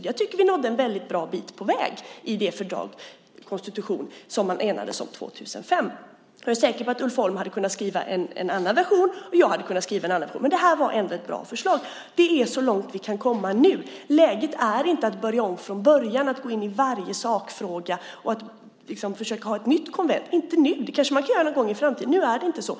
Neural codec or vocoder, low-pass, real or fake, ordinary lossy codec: none; none; real; none